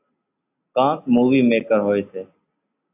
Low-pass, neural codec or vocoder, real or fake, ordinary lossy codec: 3.6 kHz; none; real; AAC, 32 kbps